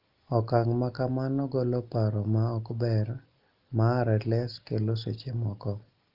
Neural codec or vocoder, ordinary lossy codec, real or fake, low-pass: none; Opus, 32 kbps; real; 5.4 kHz